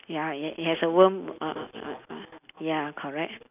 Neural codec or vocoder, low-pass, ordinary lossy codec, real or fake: none; 3.6 kHz; none; real